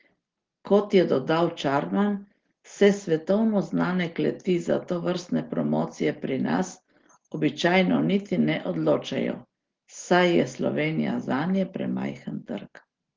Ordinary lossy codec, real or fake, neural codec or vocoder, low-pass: Opus, 16 kbps; real; none; 7.2 kHz